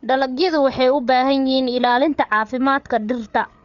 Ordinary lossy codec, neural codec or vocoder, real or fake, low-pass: MP3, 64 kbps; codec, 16 kHz, 8 kbps, FreqCodec, larger model; fake; 7.2 kHz